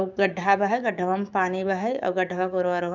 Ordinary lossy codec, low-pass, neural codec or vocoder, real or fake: none; 7.2 kHz; codec, 16 kHz, 6 kbps, DAC; fake